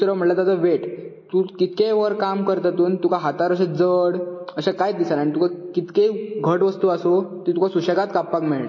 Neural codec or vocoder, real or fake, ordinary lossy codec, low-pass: none; real; MP3, 32 kbps; 7.2 kHz